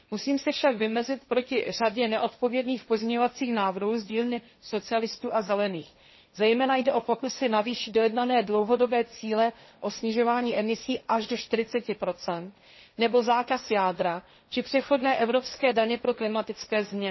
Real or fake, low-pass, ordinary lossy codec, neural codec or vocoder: fake; 7.2 kHz; MP3, 24 kbps; codec, 16 kHz, 1.1 kbps, Voila-Tokenizer